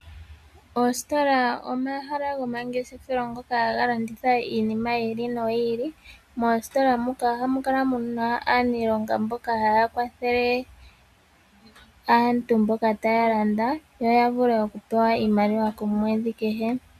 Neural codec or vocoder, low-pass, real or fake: none; 14.4 kHz; real